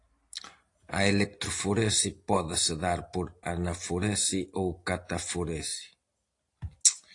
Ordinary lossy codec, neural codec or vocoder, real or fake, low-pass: AAC, 48 kbps; none; real; 10.8 kHz